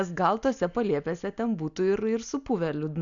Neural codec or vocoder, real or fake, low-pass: none; real; 7.2 kHz